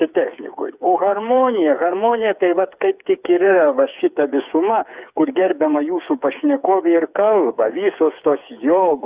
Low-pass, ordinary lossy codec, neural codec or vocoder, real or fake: 3.6 kHz; Opus, 64 kbps; codec, 16 kHz, 4 kbps, FreqCodec, smaller model; fake